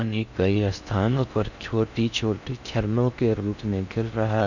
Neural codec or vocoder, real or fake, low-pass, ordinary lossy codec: codec, 16 kHz in and 24 kHz out, 0.6 kbps, FocalCodec, streaming, 4096 codes; fake; 7.2 kHz; none